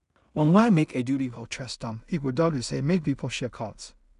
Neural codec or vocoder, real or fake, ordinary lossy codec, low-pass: codec, 16 kHz in and 24 kHz out, 0.4 kbps, LongCat-Audio-Codec, two codebook decoder; fake; none; 10.8 kHz